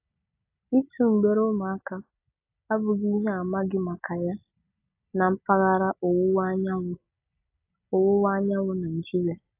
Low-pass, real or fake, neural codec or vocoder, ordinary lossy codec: 3.6 kHz; real; none; AAC, 32 kbps